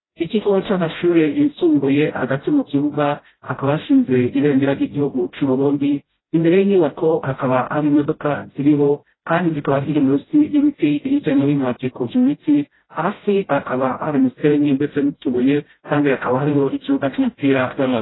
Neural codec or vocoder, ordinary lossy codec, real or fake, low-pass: codec, 16 kHz, 0.5 kbps, FreqCodec, smaller model; AAC, 16 kbps; fake; 7.2 kHz